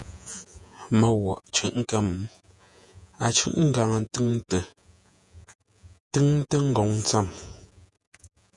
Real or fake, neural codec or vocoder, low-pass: fake; vocoder, 48 kHz, 128 mel bands, Vocos; 10.8 kHz